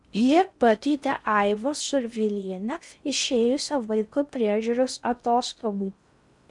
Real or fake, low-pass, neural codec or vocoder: fake; 10.8 kHz; codec, 16 kHz in and 24 kHz out, 0.6 kbps, FocalCodec, streaming, 4096 codes